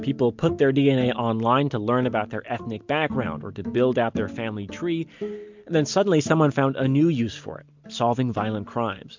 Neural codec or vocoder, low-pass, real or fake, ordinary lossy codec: none; 7.2 kHz; real; MP3, 64 kbps